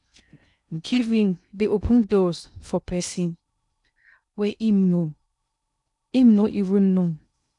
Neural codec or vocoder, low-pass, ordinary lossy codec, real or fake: codec, 16 kHz in and 24 kHz out, 0.6 kbps, FocalCodec, streaming, 2048 codes; 10.8 kHz; none; fake